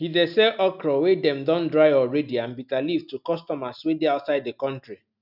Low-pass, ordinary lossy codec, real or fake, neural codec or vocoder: 5.4 kHz; none; real; none